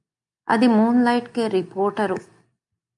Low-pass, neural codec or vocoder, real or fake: 10.8 kHz; none; real